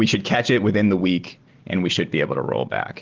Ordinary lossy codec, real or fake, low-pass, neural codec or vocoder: Opus, 16 kbps; real; 7.2 kHz; none